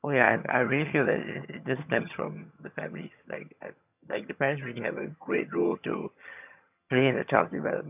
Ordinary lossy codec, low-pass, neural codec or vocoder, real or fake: none; 3.6 kHz; vocoder, 22.05 kHz, 80 mel bands, HiFi-GAN; fake